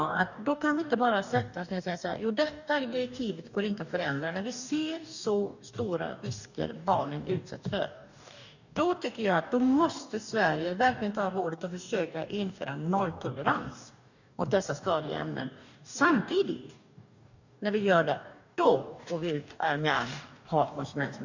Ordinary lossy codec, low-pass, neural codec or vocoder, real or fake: none; 7.2 kHz; codec, 44.1 kHz, 2.6 kbps, DAC; fake